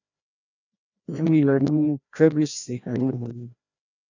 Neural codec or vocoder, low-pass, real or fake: codec, 16 kHz, 1 kbps, FreqCodec, larger model; 7.2 kHz; fake